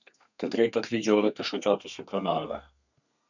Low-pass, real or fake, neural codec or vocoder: 7.2 kHz; fake; codec, 32 kHz, 1.9 kbps, SNAC